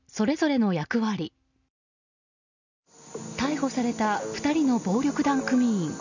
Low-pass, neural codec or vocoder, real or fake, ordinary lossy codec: 7.2 kHz; none; real; none